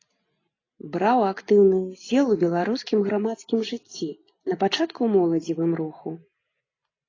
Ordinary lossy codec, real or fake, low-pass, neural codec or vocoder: AAC, 32 kbps; real; 7.2 kHz; none